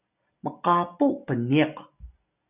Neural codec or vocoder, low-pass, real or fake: none; 3.6 kHz; real